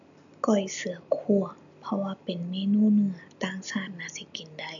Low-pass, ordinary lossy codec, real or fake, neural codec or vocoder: 7.2 kHz; none; real; none